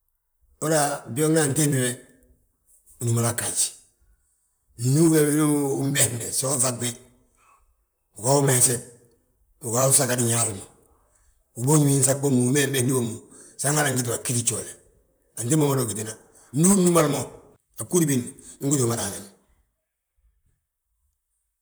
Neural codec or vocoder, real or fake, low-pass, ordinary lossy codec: vocoder, 44.1 kHz, 128 mel bands, Pupu-Vocoder; fake; none; none